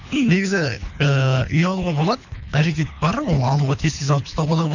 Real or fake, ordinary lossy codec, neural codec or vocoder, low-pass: fake; none; codec, 24 kHz, 3 kbps, HILCodec; 7.2 kHz